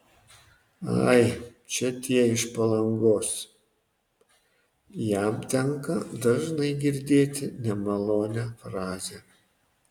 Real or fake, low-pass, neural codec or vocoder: real; 19.8 kHz; none